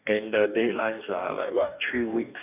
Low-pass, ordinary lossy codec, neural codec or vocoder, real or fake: 3.6 kHz; none; codec, 44.1 kHz, 2.6 kbps, DAC; fake